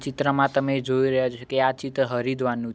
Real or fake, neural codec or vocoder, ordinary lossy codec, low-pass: real; none; none; none